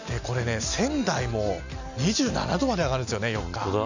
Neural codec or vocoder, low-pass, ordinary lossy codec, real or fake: none; 7.2 kHz; none; real